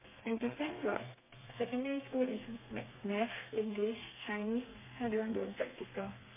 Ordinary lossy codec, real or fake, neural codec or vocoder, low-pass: MP3, 32 kbps; fake; codec, 32 kHz, 1.9 kbps, SNAC; 3.6 kHz